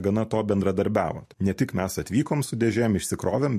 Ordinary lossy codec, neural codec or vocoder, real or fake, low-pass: MP3, 64 kbps; none; real; 14.4 kHz